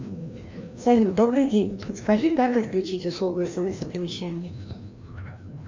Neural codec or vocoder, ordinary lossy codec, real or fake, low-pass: codec, 16 kHz, 1 kbps, FreqCodec, larger model; MP3, 64 kbps; fake; 7.2 kHz